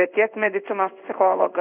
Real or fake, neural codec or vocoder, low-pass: fake; codec, 16 kHz in and 24 kHz out, 1 kbps, XY-Tokenizer; 3.6 kHz